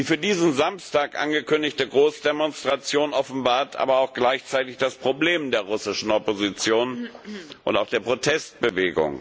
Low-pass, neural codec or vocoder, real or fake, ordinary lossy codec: none; none; real; none